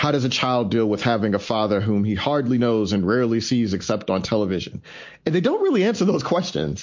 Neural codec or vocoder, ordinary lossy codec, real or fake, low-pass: none; MP3, 48 kbps; real; 7.2 kHz